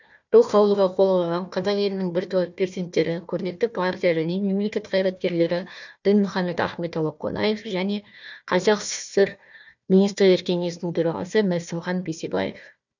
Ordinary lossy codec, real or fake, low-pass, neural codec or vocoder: none; fake; 7.2 kHz; codec, 16 kHz, 1 kbps, FunCodec, trained on Chinese and English, 50 frames a second